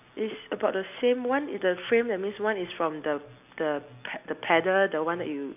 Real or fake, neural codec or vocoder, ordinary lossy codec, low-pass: real; none; none; 3.6 kHz